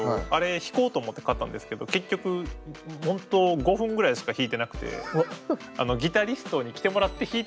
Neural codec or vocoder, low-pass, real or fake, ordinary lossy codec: none; none; real; none